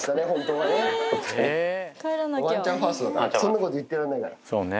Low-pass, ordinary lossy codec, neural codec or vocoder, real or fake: none; none; none; real